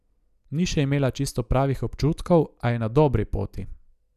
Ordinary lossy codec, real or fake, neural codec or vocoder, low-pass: none; real; none; 14.4 kHz